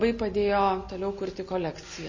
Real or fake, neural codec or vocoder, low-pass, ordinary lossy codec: real; none; 7.2 kHz; MP3, 32 kbps